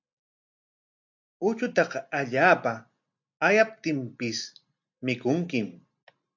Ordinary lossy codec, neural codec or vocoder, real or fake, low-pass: MP3, 64 kbps; none; real; 7.2 kHz